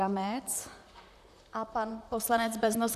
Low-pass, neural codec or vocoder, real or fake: 14.4 kHz; vocoder, 44.1 kHz, 128 mel bands, Pupu-Vocoder; fake